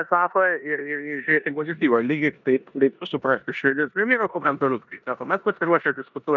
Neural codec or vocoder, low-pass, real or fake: codec, 16 kHz in and 24 kHz out, 0.9 kbps, LongCat-Audio-Codec, four codebook decoder; 7.2 kHz; fake